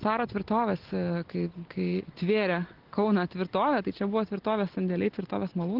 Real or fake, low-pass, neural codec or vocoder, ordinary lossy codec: real; 5.4 kHz; none; Opus, 16 kbps